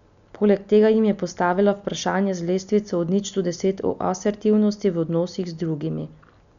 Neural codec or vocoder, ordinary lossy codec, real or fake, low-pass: none; none; real; 7.2 kHz